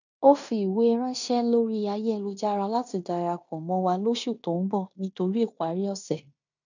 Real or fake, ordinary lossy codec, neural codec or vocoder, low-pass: fake; none; codec, 16 kHz in and 24 kHz out, 0.9 kbps, LongCat-Audio-Codec, fine tuned four codebook decoder; 7.2 kHz